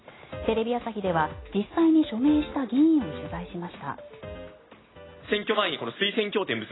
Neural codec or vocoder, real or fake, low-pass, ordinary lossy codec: none; real; 7.2 kHz; AAC, 16 kbps